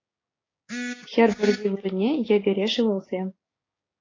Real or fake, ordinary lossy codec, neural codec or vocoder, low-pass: fake; AAC, 32 kbps; codec, 16 kHz, 6 kbps, DAC; 7.2 kHz